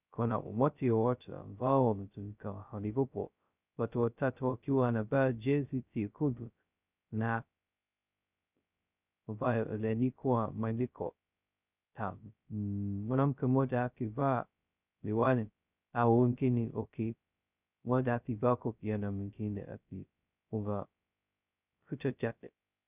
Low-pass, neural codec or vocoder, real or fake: 3.6 kHz; codec, 16 kHz, 0.2 kbps, FocalCodec; fake